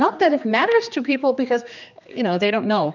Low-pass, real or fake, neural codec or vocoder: 7.2 kHz; fake; codec, 16 kHz, 2 kbps, X-Codec, HuBERT features, trained on general audio